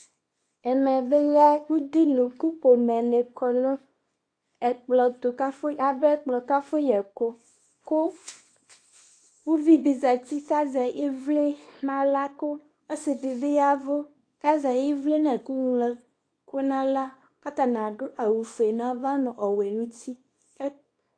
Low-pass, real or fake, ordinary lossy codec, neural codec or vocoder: 9.9 kHz; fake; AAC, 48 kbps; codec, 24 kHz, 0.9 kbps, WavTokenizer, small release